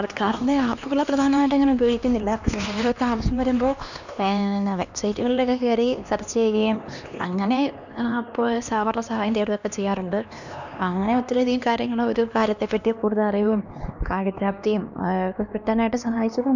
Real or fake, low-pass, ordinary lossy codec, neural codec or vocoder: fake; 7.2 kHz; none; codec, 16 kHz, 2 kbps, X-Codec, HuBERT features, trained on LibriSpeech